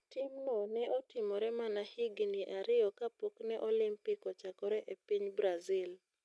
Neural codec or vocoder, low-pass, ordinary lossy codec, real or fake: none; none; none; real